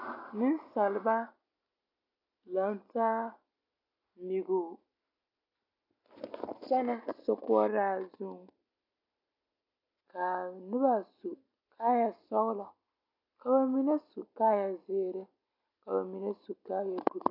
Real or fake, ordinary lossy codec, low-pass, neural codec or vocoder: real; AAC, 48 kbps; 5.4 kHz; none